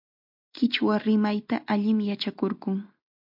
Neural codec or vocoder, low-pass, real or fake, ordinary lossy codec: none; 5.4 kHz; real; MP3, 48 kbps